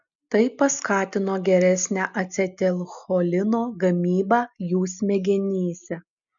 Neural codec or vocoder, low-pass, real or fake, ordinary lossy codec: none; 7.2 kHz; real; MP3, 96 kbps